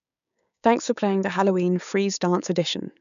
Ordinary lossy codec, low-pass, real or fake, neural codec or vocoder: none; 7.2 kHz; fake; codec, 16 kHz, 6 kbps, DAC